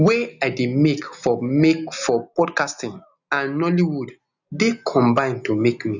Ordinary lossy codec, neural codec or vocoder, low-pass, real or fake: none; none; 7.2 kHz; real